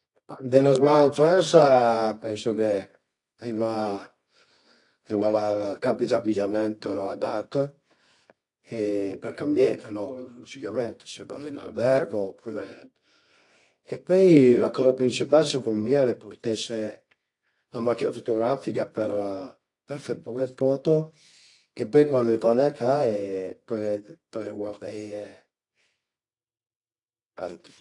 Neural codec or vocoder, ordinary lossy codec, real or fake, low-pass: codec, 24 kHz, 0.9 kbps, WavTokenizer, medium music audio release; AAC, 48 kbps; fake; 10.8 kHz